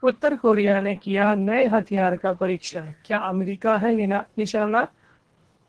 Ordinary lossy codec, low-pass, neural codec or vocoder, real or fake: Opus, 16 kbps; 10.8 kHz; codec, 24 kHz, 1.5 kbps, HILCodec; fake